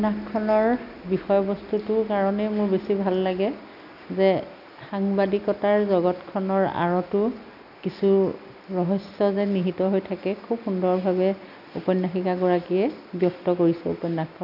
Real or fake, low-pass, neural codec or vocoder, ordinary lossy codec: real; 5.4 kHz; none; none